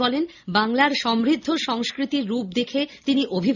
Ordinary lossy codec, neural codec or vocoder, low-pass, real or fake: none; none; 7.2 kHz; real